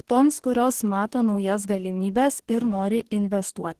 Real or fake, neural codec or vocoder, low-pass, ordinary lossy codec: fake; codec, 44.1 kHz, 2.6 kbps, DAC; 14.4 kHz; Opus, 16 kbps